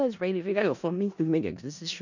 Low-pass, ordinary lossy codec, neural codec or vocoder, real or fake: 7.2 kHz; none; codec, 16 kHz in and 24 kHz out, 0.4 kbps, LongCat-Audio-Codec, four codebook decoder; fake